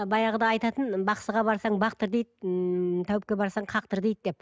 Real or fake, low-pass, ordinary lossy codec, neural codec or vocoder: real; none; none; none